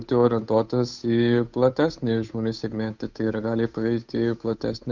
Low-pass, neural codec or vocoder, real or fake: 7.2 kHz; codec, 16 kHz, 8 kbps, FunCodec, trained on Chinese and English, 25 frames a second; fake